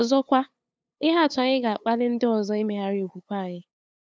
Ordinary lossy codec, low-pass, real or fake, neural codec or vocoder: none; none; fake; codec, 16 kHz, 8 kbps, FunCodec, trained on LibriTTS, 25 frames a second